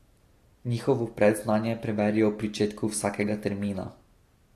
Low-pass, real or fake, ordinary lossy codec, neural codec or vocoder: 14.4 kHz; real; AAC, 48 kbps; none